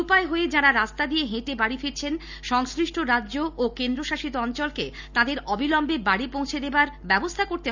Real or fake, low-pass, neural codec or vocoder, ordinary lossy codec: real; 7.2 kHz; none; none